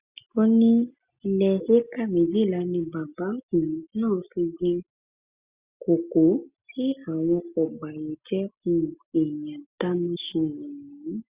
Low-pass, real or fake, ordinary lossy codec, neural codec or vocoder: 3.6 kHz; real; Opus, 64 kbps; none